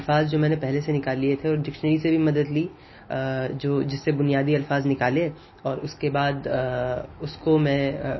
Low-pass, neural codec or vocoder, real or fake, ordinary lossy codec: 7.2 kHz; none; real; MP3, 24 kbps